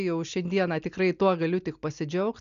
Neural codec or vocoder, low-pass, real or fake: none; 7.2 kHz; real